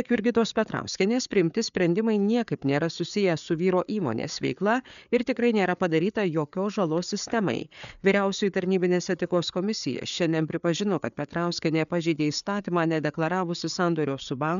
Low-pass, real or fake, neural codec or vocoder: 7.2 kHz; fake; codec, 16 kHz, 4 kbps, FreqCodec, larger model